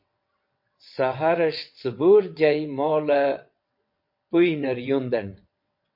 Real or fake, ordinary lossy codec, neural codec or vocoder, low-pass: fake; MP3, 32 kbps; vocoder, 22.05 kHz, 80 mel bands, WaveNeXt; 5.4 kHz